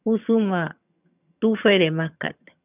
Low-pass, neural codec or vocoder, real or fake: 3.6 kHz; vocoder, 22.05 kHz, 80 mel bands, HiFi-GAN; fake